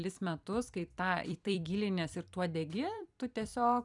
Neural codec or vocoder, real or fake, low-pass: vocoder, 44.1 kHz, 128 mel bands every 256 samples, BigVGAN v2; fake; 10.8 kHz